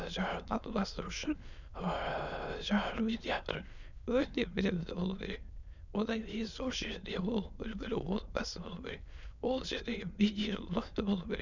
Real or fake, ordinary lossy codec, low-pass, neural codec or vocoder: fake; none; 7.2 kHz; autoencoder, 22.05 kHz, a latent of 192 numbers a frame, VITS, trained on many speakers